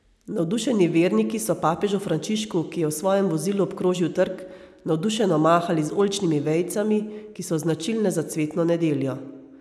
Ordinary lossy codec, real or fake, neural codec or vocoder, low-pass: none; real; none; none